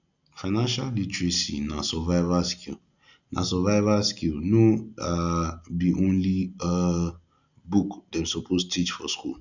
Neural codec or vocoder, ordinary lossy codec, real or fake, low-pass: none; none; real; 7.2 kHz